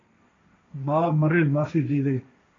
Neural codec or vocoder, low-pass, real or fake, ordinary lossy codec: codec, 16 kHz, 1.1 kbps, Voila-Tokenizer; 7.2 kHz; fake; AAC, 32 kbps